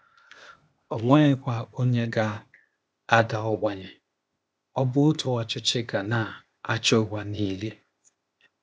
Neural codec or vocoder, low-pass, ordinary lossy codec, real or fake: codec, 16 kHz, 0.8 kbps, ZipCodec; none; none; fake